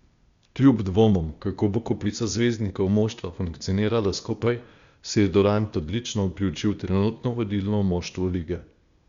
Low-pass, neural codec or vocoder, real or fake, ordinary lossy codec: 7.2 kHz; codec, 16 kHz, 0.8 kbps, ZipCodec; fake; Opus, 64 kbps